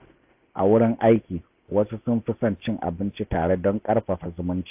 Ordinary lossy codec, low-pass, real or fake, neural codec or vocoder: AAC, 32 kbps; 3.6 kHz; real; none